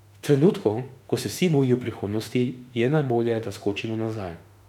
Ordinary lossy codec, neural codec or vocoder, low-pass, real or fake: none; autoencoder, 48 kHz, 32 numbers a frame, DAC-VAE, trained on Japanese speech; 19.8 kHz; fake